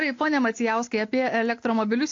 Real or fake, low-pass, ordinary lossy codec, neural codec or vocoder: real; 7.2 kHz; AAC, 48 kbps; none